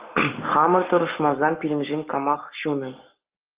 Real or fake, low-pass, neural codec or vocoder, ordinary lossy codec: fake; 3.6 kHz; codec, 44.1 kHz, 7.8 kbps, Pupu-Codec; Opus, 24 kbps